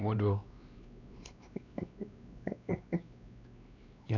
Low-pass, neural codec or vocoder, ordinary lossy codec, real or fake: 7.2 kHz; codec, 16 kHz, 2 kbps, X-Codec, WavLM features, trained on Multilingual LibriSpeech; none; fake